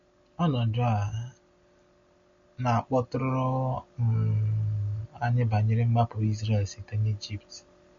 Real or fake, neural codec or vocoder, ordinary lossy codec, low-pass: real; none; MP3, 48 kbps; 7.2 kHz